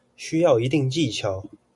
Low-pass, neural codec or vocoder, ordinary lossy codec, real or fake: 10.8 kHz; none; MP3, 96 kbps; real